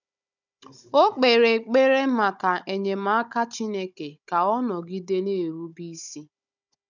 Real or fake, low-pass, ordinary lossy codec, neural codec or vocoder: fake; 7.2 kHz; none; codec, 16 kHz, 16 kbps, FunCodec, trained on Chinese and English, 50 frames a second